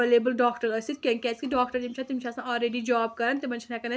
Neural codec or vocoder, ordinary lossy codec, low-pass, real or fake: none; none; none; real